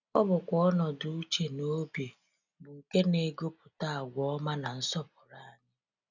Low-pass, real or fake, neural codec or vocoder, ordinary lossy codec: 7.2 kHz; real; none; none